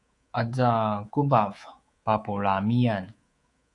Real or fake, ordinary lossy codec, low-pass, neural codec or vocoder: fake; AAC, 48 kbps; 10.8 kHz; codec, 24 kHz, 3.1 kbps, DualCodec